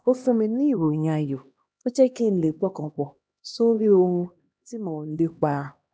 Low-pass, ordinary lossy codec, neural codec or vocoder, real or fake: none; none; codec, 16 kHz, 1 kbps, X-Codec, HuBERT features, trained on LibriSpeech; fake